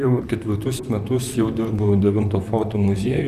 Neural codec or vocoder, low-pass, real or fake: vocoder, 44.1 kHz, 128 mel bands, Pupu-Vocoder; 14.4 kHz; fake